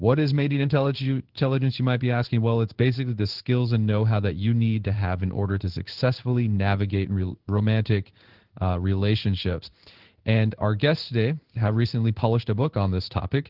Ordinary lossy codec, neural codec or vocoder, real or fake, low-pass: Opus, 16 kbps; codec, 16 kHz in and 24 kHz out, 1 kbps, XY-Tokenizer; fake; 5.4 kHz